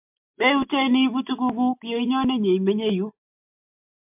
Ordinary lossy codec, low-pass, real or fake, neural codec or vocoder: MP3, 32 kbps; 3.6 kHz; fake; autoencoder, 48 kHz, 128 numbers a frame, DAC-VAE, trained on Japanese speech